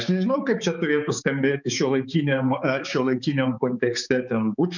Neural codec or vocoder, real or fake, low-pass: codec, 16 kHz, 4 kbps, X-Codec, HuBERT features, trained on balanced general audio; fake; 7.2 kHz